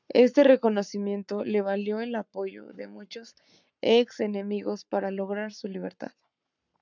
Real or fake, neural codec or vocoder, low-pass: fake; codec, 44.1 kHz, 7.8 kbps, Pupu-Codec; 7.2 kHz